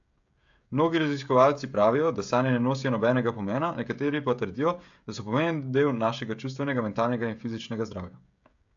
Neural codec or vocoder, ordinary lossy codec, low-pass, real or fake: codec, 16 kHz, 16 kbps, FreqCodec, smaller model; AAC, 64 kbps; 7.2 kHz; fake